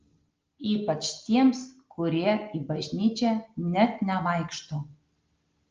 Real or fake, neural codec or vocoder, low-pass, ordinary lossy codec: real; none; 7.2 kHz; Opus, 24 kbps